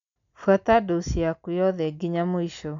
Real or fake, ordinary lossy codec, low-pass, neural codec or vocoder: real; none; 7.2 kHz; none